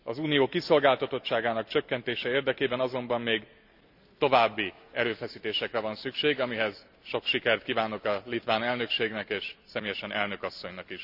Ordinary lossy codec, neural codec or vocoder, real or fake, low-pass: none; none; real; 5.4 kHz